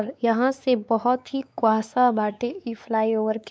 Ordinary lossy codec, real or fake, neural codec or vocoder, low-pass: none; fake; codec, 16 kHz, 4 kbps, X-Codec, WavLM features, trained on Multilingual LibriSpeech; none